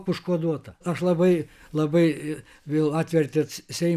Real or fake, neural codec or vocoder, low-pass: real; none; 14.4 kHz